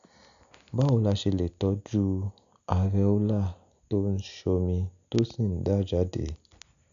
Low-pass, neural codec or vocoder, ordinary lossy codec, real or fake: 7.2 kHz; none; none; real